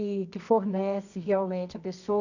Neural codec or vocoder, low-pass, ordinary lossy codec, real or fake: codec, 24 kHz, 0.9 kbps, WavTokenizer, medium music audio release; 7.2 kHz; none; fake